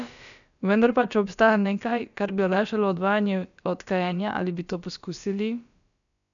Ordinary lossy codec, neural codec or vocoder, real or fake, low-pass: none; codec, 16 kHz, about 1 kbps, DyCAST, with the encoder's durations; fake; 7.2 kHz